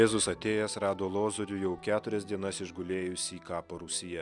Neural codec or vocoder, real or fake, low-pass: none; real; 10.8 kHz